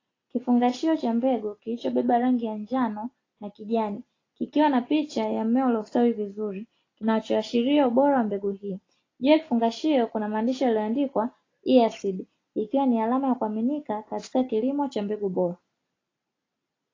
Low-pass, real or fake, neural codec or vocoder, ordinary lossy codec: 7.2 kHz; real; none; AAC, 32 kbps